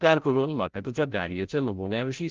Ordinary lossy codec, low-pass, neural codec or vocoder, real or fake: Opus, 32 kbps; 7.2 kHz; codec, 16 kHz, 0.5 kbps, FreqCodec, larger model; fake